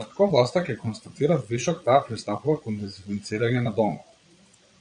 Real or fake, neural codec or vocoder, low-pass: fake; vocoder, 22.05 kHz, 80 mel bands, Vocos; 9.9 kHz